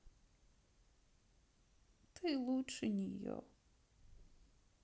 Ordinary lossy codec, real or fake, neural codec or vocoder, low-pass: none; real; none; none